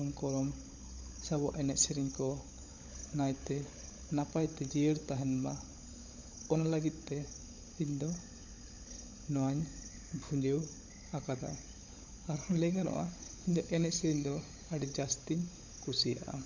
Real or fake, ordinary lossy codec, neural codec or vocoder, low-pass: fake; none; codec, 16 kHz, 16 kbps, FunCodec, trained on LibriTTS, 50 frames a second; 7.2 kHz